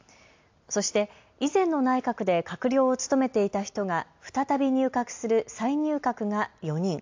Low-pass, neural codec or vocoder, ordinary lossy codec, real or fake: 7.2 kHz; none; AAC, 48 kbps; real